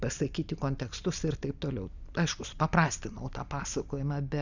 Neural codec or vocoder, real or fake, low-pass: none; real; 7.2 kHz